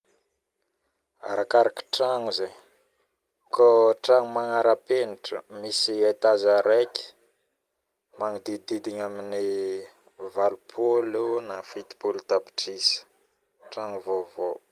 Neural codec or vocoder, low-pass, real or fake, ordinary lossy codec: none; 14.4 kHz; real; Opus, 32 kbps